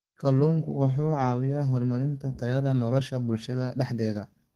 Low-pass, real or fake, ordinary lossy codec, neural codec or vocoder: 14.4 kHz; fake; Opus, 24 kbps; codec, 32 kHz, 1.9 kbps, SNAC